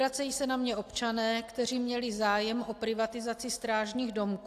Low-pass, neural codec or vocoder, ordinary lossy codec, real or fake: 14.4 kHz; none; AAC, 64 kbps; real